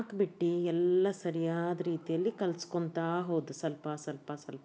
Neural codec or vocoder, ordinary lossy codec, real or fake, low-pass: none; none; real; none